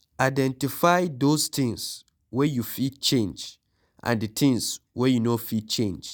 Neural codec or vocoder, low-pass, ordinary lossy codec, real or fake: none; none; none; real